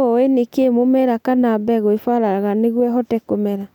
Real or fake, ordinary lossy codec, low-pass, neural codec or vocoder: real; none; 19.8 kHz; none